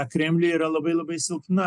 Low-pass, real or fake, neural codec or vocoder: 10.8 kHz; real; none